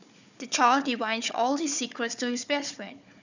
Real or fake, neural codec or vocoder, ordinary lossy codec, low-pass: fake; codec, 16 kHz, 4 kbps, FunCodec, trained on Chinese and English, 50 frames a second; none; 7.2 kHz